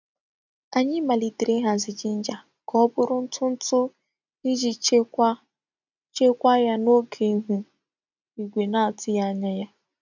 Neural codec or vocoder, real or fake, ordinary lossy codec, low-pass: none; real; none; 7.2 kHz